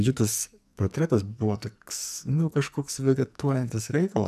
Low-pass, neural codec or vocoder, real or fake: 14.4 kHz; codec, 44.1 kHz, 2.6 kbps, SNAC; fake